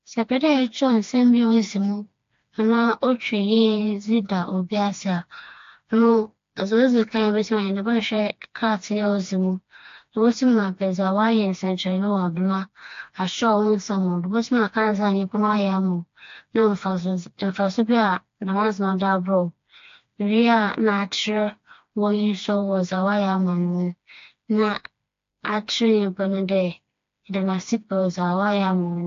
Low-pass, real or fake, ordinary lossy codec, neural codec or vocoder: 7.2 kHz; fake; AAC, 48 kbps; codec, 16 kHz, 2 kbps, FreqCodec, smaller model